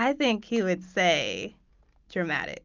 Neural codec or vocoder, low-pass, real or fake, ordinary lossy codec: none; 7.2 kHz; real; Opus, 24 kbps